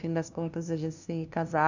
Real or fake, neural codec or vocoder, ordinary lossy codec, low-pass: fake; codec, 16 kHz, 0.5 kbps, FunCodec, trained on LibriTTS, 25 frames a second; none; 7.2 kHz